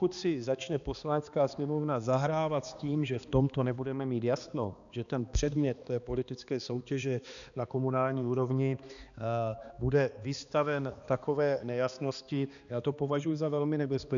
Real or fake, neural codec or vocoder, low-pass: fake; codec, 16 kHz, 2 kbps, X-Codec, HuBERT features, trained on balanced general audio; 7.2 kHz